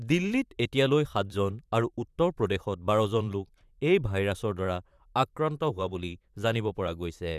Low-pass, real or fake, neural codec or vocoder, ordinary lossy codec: 14.4 kHz; fake; vocoder, 44.1 kHz, 128 mel bands, Pupu-Vocoder; none